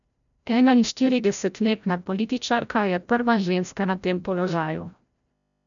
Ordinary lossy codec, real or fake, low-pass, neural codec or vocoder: none; fake; 7.2 kHz; codec, 16 kHz, 0.5 kbps, FreqCodec, larger model